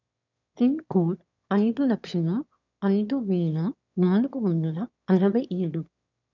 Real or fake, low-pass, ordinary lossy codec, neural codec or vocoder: fake; 7.2 kHz; none; autoencoder, 22.05 kHz, a latent of 192 numbers a frame, VITS, trained on one speaker